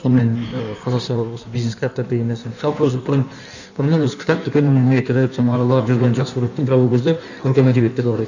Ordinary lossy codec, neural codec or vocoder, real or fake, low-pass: none; codec, 16 kHz in and 24 kHz out, 1.1 kbps, FireRedTTS-2 codec; fake; 7.2 kHz